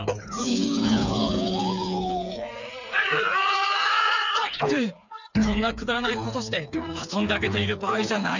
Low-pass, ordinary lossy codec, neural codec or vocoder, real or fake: 7.2 kHz; none; codec, 16 kHz, 4 kbps, FreqCodec, smaller model; fake